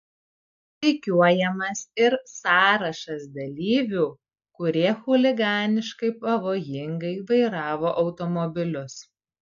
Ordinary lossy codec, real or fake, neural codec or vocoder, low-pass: AAC, 64 kbps; real; none; 7.2 kHz